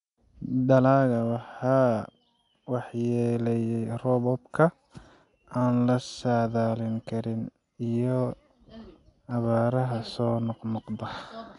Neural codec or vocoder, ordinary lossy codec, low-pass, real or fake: none; none; 10.8 kHz; real